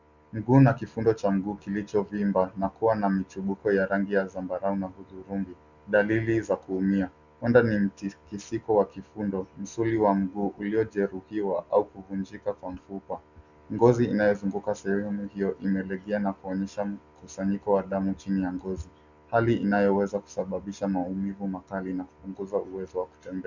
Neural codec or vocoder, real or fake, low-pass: none; real; 7.2 kHz